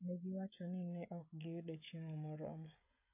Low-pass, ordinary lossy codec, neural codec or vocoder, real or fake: 3.6 kHz; AAC, 32 kbps; codec, 16 kHz, 16 kbps, FreqCodec, smaller model; fake